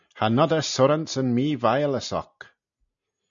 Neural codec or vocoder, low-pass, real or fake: none; 7.2 kHz; real